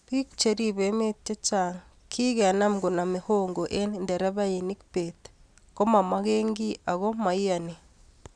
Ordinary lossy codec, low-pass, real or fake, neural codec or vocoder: none; 9.9 kHz; real; none